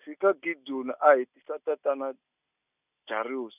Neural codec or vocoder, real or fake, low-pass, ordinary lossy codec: none; real; 3.6 kHz; none